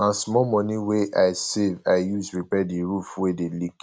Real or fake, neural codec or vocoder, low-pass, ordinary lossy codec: real; none; none; none